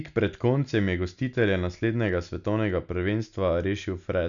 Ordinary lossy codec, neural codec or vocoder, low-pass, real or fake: none; none; 7.2 kHz; real